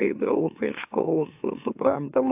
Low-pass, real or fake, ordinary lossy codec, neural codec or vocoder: 3.6 kHz; fake; AAC, 32 kbps; autoencoder, 44.1 kHz, a latent of 192 numbers a frame, MeloTTS